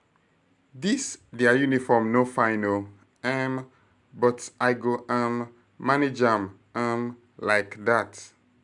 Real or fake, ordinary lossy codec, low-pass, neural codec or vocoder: real; none; 10.8 kHz; none